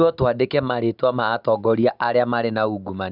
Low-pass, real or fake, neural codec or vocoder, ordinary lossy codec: 5.4 kHz; real; none; none